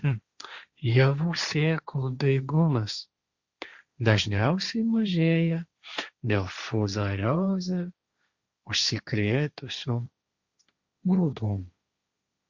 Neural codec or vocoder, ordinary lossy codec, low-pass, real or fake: codec, 16 kHz, 1.1 kbps, Voila-Tokenizer; Opus, 64 kbps; 7.2 kHz; fake